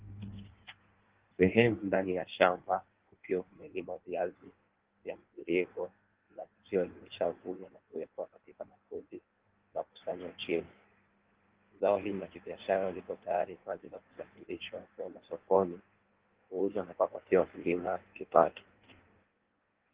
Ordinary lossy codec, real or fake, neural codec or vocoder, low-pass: Opus, 16 kbps; fake; codec, 16 kHz in and 24 kHz out, 1.1 kbps, FireRedTTS-2 codec; 3.6 kHz